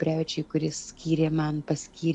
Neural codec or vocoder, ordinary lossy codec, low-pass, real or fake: none; AAC, 64 kbps; 10.8 kHz; real